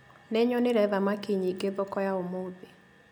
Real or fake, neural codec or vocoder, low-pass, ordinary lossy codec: real; none; none; none